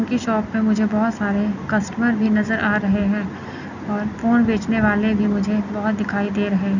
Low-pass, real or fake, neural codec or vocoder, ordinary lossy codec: 7.2 kHz; real; none; none